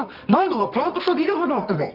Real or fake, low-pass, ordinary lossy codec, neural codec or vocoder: fake; 5.4 kHz; none; codec, 24 kHz, 0.9 kbps, WavTokenizer, medium music audio release